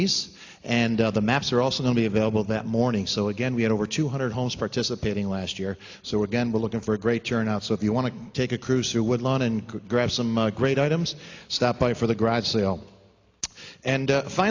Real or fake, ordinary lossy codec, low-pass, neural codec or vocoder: real; AAC, 48 kbps; 7.2 kHz; none